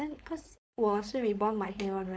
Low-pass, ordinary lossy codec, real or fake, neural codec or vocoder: none; none; fake; codec, 16 kHz, 4.8 kbps, FACodec